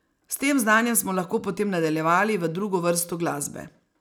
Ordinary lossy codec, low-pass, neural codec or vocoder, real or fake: none; none; none; real